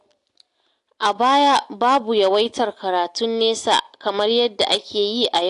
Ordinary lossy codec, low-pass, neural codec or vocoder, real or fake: AAC, 64 kbps; 10.8 kHz; none; real